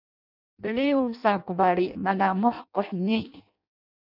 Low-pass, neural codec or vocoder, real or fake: 5.4 kHz; codec, 16 kHz in and 24 kHz out, 0.6 kbps, FireRedTTS-2 codec; fake